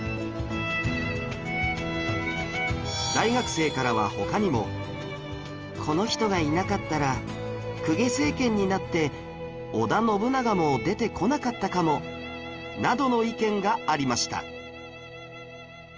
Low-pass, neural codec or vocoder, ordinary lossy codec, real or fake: 7.2 kHz; none; Opus, 24 kbps; real